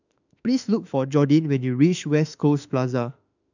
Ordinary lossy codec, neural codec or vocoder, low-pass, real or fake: none; autoencoder, 48 kHz, 32 numbers a frame, DAC-VAE, trained on Japanese speech; 7.2 kHz; fake